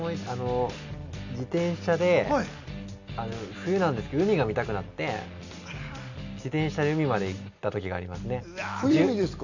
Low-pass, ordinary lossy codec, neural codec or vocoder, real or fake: 7.2 kHz; none; none; real